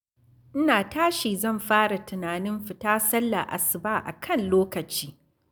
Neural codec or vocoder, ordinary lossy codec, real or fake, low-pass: none; none; real; none